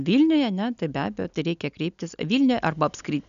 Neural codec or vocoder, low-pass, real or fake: none; 7.2 kHz; real